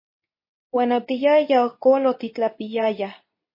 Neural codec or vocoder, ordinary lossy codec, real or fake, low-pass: codec, 24 kHz, 0.9 kbps, WavTokenizer, medium speech release version 2; MP3, 24 kbps; fake; 5.4 kHz